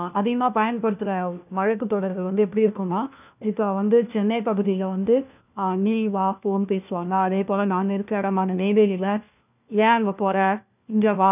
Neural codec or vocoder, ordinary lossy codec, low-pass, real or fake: codec, 16 kHz, 1 kbps, FunCodec, trained on LibriTTS, 50 frames a second; none; 3.6 kHz; fake